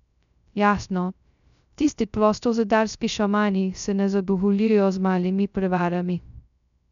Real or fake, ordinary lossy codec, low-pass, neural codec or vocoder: fake; none; 7.2 kHz; codec, 16 kHz, 0.2 kbps, FocalCodec